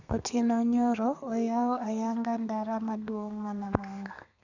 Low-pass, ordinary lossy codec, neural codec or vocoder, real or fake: 7.2 kHz; none; codec, 32 kHz, 1.9 kbps, SNAC; fake